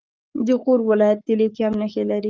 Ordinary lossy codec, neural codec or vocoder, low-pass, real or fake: Opus, 24 kbps; codec, 44.1 kHz, 7.8 kbps, Pupu-Codec; 7.2 kHz; fake